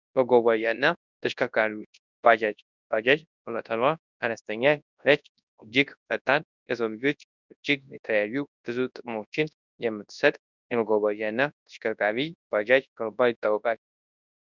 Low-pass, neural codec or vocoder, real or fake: 7.2 kHz; codec, 24 kHz, 0.9 kbps, WavTokenizer, large speech release; fake